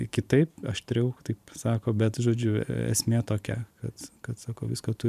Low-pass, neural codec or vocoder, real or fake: 14.4 kHz; vocoder, 44.1 kHz, 128 mel bands every 512 samples, BigVGAN v2; fake